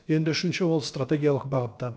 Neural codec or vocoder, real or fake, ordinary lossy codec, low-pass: codec, 16 kHz, about 1 kbps, DyCAST, with the encoder's durations; fake; none; none